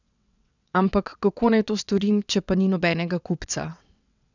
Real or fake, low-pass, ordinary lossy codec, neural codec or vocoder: fake; 7.2 kHz; none; vocoder, 22.05 kHz, 80 mel bands, WaveNeXt